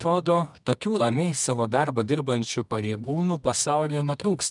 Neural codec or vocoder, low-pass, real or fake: codec, 24 kHz, 0.9 kbps, WavTokenizer, medium music audio release; 10.8 kHz; fake